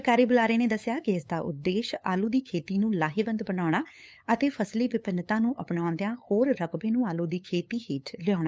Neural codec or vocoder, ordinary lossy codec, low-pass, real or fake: codec, 16 kHz, 8 kbps, FunCodec, trained on LibriTTS, 25 frames a second; none; none; fake